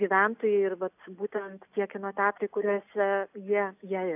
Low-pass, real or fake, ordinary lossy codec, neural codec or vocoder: 3.6 kHz; real; AAC, 32 kbps; none